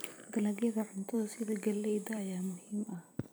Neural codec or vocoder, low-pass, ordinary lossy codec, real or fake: vocoder, 44.1 kHz, 128 mel bands every 256 samples, BigVGAN v2; none; none; fake